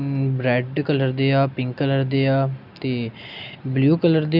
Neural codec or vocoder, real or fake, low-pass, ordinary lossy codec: none; real; 5.4 kHz; none